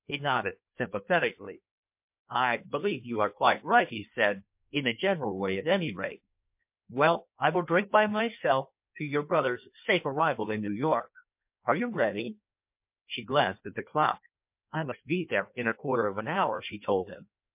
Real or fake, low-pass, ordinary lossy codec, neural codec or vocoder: fake; 3.6 kHz; MP3, 32 kbps; codec, 16 kHz in and 24 kHz out, 1.1 kbps, FireRedTTS-2 codec